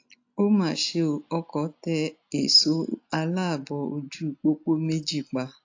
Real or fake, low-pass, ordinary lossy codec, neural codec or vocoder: real; 7.2 kHz; AAC, 48 kbps; none